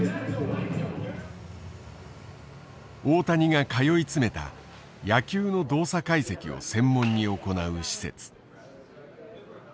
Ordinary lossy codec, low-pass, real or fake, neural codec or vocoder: none; none; real; none